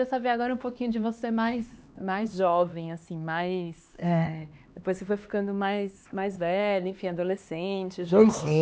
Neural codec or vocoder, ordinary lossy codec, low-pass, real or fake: codec, 16 kHz, 2 kbps, X-Codec, HuBERT features, trained on LibriSpeech; none; none; fake